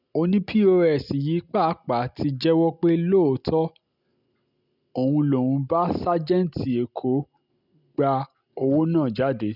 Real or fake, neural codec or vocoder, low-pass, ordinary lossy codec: real; none; 5.4 kHz; none